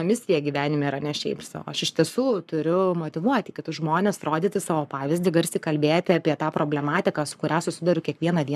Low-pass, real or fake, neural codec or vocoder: 14.4 kHz; fake; codec, 44.1 kHz, 7.8 kbps, Pupu-Codec